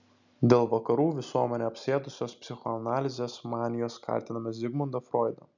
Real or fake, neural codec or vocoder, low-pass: real; none; 7.2 kHz